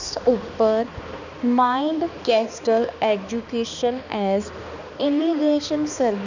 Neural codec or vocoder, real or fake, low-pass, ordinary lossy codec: codec, 16 kHz, 2 kbps, X-Codec, HuBERT features, trained on balanced general audio; fake; 7.2 kHz; none